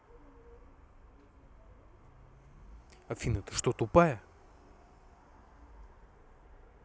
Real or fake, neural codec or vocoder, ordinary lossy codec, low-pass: real; none; none; none